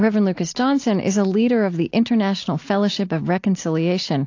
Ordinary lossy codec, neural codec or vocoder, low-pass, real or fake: AAC, 48 kbps; none; 7.2 kHz; real